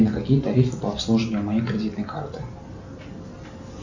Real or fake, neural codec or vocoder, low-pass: real; none; 7.2 kHz